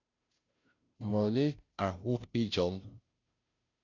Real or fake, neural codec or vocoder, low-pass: fake; codec, 16 kHz, 0.5 kbps, FunCodec, trained on Chinese and English, 25 frames a second; 7.2 kHz